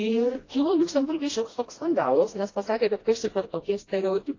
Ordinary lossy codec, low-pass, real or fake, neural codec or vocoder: AAC, 32 kbps; 7.2 kHz; fake; codec, 16 kHz, 1 kbps, FreqCodec, smaller model